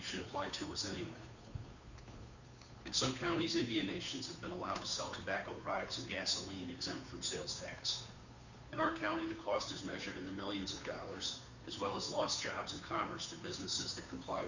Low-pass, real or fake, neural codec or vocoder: 7.2 kHz; fake; codec, 16 kHz, 2 kbps, FunCodec, trained on Chinese and English, 25 frames a second